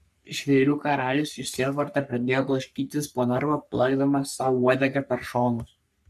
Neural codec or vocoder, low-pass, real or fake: codec, 44.1 kHz, 3.4 kbps, Pupu-Codec; 14.4 kHz; fake